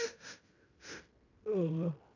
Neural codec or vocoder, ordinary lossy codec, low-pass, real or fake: codec, 16 kHz in and 24 kHz out, 0.9 kbps, LongCat-Audio-Codec, fine tuned four codebook decoder; none; 7.2 kHz; fake